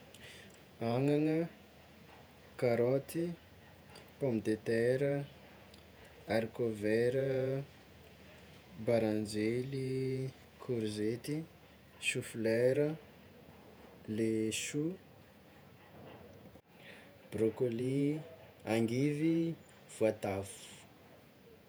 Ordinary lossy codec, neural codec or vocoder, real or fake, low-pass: none; vocoder, 48 kHz, 128 mel bands, Vocos; fake; none